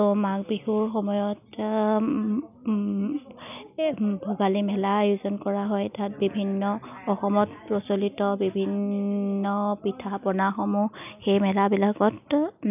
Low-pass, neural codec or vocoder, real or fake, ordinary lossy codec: 3.6 kHz; none; real; none